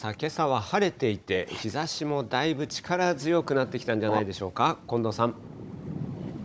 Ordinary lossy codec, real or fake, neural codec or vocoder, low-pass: none; fake; codec, 16 kHz, 16 kbps, FunCodec, trained on Chinese and English, 50 frames a second; none